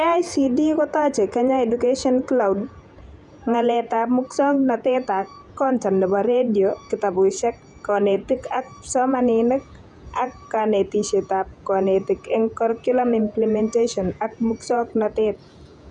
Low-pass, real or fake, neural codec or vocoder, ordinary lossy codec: 10.8 kHz; fake; vocoder, 48 kHz, 128 mel bands, Vocos; none